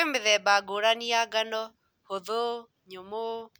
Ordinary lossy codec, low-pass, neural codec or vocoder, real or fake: none; none; none; real